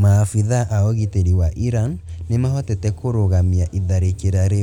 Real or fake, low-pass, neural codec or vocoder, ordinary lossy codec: real; 19.8 kHz; none; none